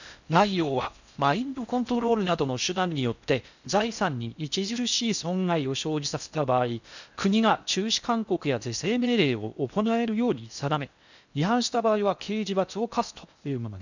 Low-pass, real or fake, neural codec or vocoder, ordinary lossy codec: 7.2 kHz; fake; codec, 16 kHz in and 24 kHz out, 0.6 kbps, FocalCodec, streaming, 4096 codes; none